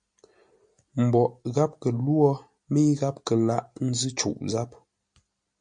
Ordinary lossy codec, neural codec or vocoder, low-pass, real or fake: MP3, 48 kbps; none; 9.9 kHz; real